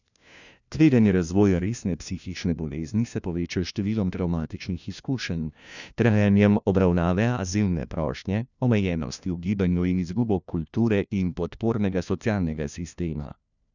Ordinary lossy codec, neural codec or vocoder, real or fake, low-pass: none; codec, 16 kHz, 1 kbps, FunCodec, trained on LibriTTS, 50 frames a second; fake; 7.2 kHz